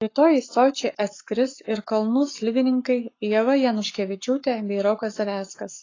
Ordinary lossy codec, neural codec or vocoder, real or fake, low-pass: AAC, 32 kbps; codec, 44.1 kHz, 7.8 kbps, Pupu-Codec; fake; 7.2 kHz